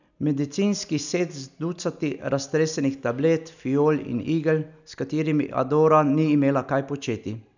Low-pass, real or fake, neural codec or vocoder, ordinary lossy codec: 7.2 kHz; real; none; none